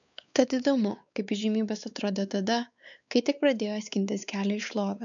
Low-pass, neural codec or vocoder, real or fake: 7.2 kHz; codec, 16 kHz, 4 kbps, X-Codec, WavLM features, trained on Multilingual LibriSpeech; fake